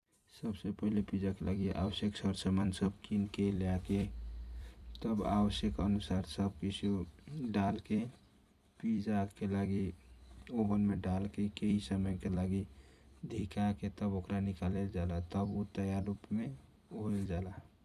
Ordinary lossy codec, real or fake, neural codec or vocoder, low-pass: none; fake; vocoder, 44.1 kHz, 128 mel bands every 256 samples, BigVGAN v2; 14.4 kHz